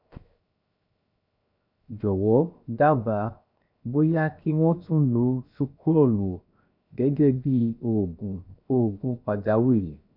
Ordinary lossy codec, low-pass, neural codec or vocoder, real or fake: none; 5.4 kHz; codec, 16 kHz, 0.7 kbps, FocalCodec; fake